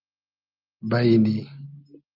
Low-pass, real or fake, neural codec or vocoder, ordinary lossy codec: 5.4 kHz; real; none; Opus, 24 kbps